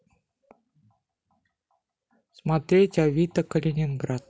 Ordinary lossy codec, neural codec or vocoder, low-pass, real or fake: none; none; none; real